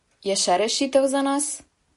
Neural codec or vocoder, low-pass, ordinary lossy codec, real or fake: none; 14.4 kHz; MP3, 48 kbps; real